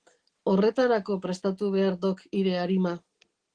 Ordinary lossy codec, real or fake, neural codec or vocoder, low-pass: Opus, 16 kbps; real; none; 9.9 kHz